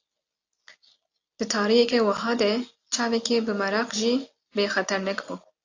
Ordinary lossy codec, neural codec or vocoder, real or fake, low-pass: AAC, 48 kbps; none; real; 7.2 kHz